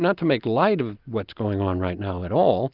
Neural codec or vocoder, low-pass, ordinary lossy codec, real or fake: none; 5.4 kHz; Opus, 32 kbps; real